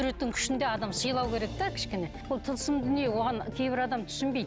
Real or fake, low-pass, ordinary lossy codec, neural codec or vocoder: real; none; none; none